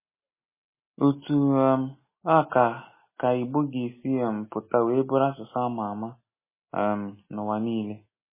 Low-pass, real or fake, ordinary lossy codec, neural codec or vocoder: 3.6 kHz; real; MP3, 16 kbps; none